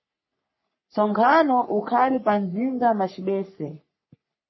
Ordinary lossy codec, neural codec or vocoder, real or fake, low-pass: MP3, 24 kbps; codec, 44.1 kHz, 3.4 kbps, Pupu-Codec; fake; 7.2 kHz